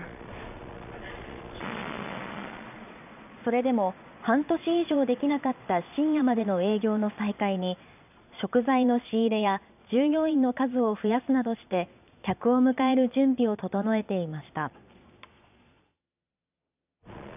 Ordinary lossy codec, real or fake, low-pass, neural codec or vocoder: none; fake; 3.6 kHz; vocoder, 22.05 kHz, 80 mel bands, Vocos